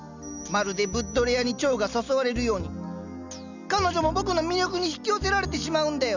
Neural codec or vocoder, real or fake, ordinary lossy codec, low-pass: none; real; none; 7.2 kHz